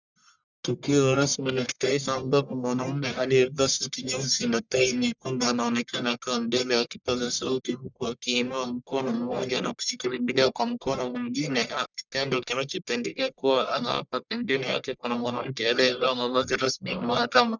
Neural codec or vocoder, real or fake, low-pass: codec, 44.1 kHz, 1.7 kbps, Pupu-Codec; fake; 7.2 kHz